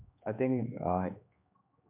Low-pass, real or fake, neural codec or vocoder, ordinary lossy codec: 3.6 kHz; fake; codec, 16 kHz, 2 kbps, X-Codec, HuBERT features, trained on general audio; MP3, 32 kbps